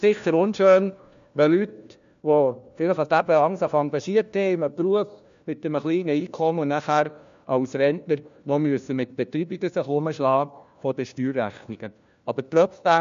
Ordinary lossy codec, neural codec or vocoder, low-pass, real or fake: MP3, 64 kbps; codec, 16 kHz, 1 kbps, FunCodec, trained on LibriTTS, 50 frames a second; 7.2 kHz; fake